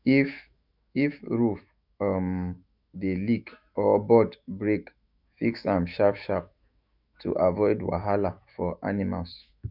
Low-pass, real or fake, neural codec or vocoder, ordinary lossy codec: 5.4 kHz; fake; autoencoder, 48 kHz, 128 numbers a frame, DAC-VAE, trained on Japanese speech; none